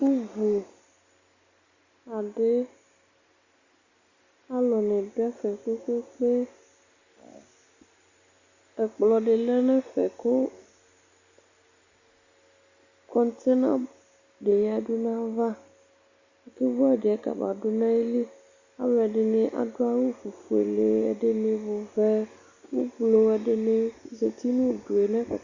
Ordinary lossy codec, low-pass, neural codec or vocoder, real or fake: Opus, 64 kbps; 7.2 kHz; none; real